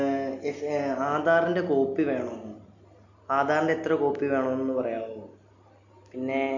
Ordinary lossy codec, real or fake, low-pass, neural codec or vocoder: none; real; 7.2 kHz; none